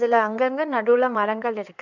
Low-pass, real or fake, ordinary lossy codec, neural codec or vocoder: 7.2 kHz; fake; none; codec, 16 kHz in and 24 kHz out, 2.2 kbps, FireRedTTS-2 codec